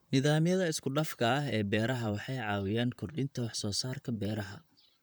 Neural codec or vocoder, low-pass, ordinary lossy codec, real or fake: vocoder, 44.1 kHz, 128 mel bands, Pupu-Vocoder; none; none; fake